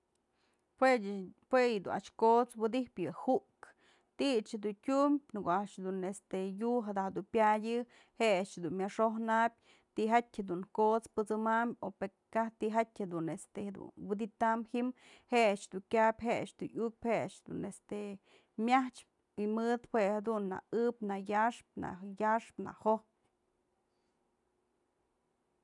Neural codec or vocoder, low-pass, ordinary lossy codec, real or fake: none; 10.8 kHz; none; real